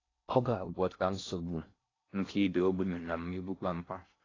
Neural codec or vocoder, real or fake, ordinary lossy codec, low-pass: codec, 16 kHz in and 24 kHz out, 0.6 kbps, FocalCodec, streaming, 4096 codes; fake; AAC, 32 kbps; 7.2 kHz